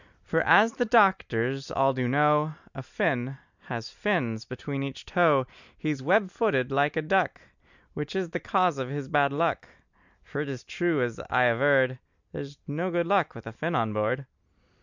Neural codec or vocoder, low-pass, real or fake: none; 7.2 kHz; real